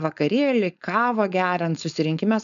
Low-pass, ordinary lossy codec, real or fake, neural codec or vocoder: 7.2 kHz; MP3, 96 kbps; fake; codec, 16 kHz, 4.8 kbps, FACodec